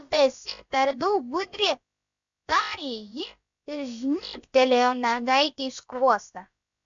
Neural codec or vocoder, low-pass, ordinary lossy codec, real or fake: codec, 16 kHz, about 1 kbps, DyCAST, with the encoder's durations; 7.2 kHz; MP3, 64 kbps; fake